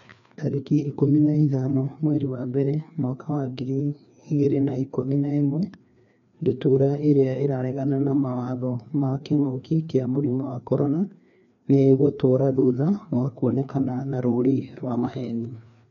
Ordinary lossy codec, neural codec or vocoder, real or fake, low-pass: MP3, 96 kbps; codec, 16 kHz, 2 kbps, FreqCodec, larger model; fake; 7.2 kHz